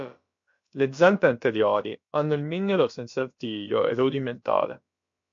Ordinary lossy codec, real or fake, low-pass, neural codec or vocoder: MP3, 48 kbps; fake; 7.2 kHz; codec, 16 kHz, about 1 kbps, DyCAST, with the encoder's durations